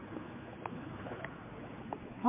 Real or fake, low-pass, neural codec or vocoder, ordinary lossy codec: fake; 3.6 kHz; codec, 16 kHz, 4 kbps, X-Codec, HuBERT features, trained on general audio; MP3, 32 kbps